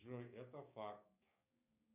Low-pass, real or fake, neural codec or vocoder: 3.6 kHz; fake; codec, 16 kHz, 6 kbps, DAC